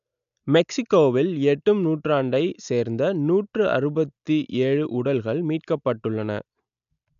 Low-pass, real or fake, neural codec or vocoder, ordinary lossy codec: 7.2 kHz; real; none; none